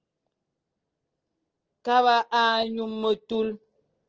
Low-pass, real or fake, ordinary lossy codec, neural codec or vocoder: 7.2 kHz; real; Opus, 16 kbps; none